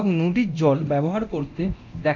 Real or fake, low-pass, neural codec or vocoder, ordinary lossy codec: fake; 7.2 kHz; codec, 24 kHz, 0.9 kbps, DualCodec; none